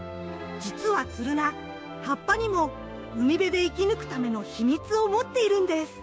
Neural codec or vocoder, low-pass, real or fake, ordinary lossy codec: codec, 16 kHz, 6 kbps, DAC; none; fake; none